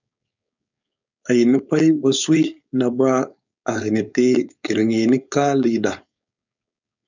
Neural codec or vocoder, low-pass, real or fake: codec, 16 kHz, 4.8 kbps, FACodec; 7.2 kHz; fake